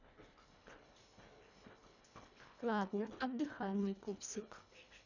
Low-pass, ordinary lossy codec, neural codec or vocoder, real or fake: 7.2 kHz; none; codec, 24 kHz, 1.5 kbps, HILCodec; fake